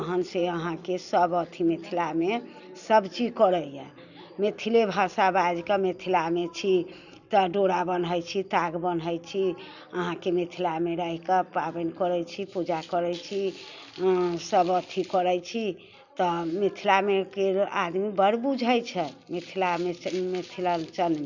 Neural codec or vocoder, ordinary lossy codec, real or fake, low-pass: none; none; real; 7.2 kHz